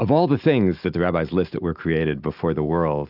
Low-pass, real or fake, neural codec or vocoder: 5.4 kHz; real; none